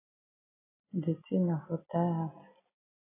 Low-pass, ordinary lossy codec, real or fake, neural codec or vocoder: 3.6 kHz; AAC, 16 kbps; real; none